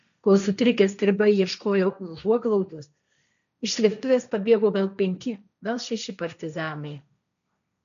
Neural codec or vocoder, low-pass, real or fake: codec, 16 kHz, 1.1 kbps, Voila-Tokenizer; 7.2 kHz; fake